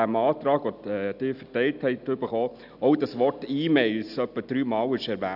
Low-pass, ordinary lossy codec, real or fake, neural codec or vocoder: 5.4 kHz; none; real; none